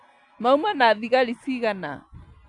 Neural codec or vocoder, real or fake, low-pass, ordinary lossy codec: none; real; 10.8 kHz; none